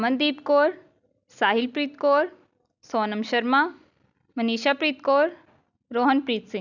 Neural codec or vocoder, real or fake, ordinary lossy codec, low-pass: none; real; none; 7.2 kHz